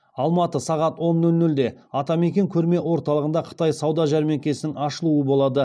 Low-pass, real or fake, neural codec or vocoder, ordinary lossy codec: none; real; none; none